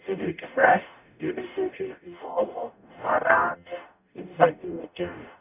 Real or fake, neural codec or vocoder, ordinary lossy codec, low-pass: fake; codec, 44.1 kHz, 0.9 kbps, DAC; none; 3.6 kHz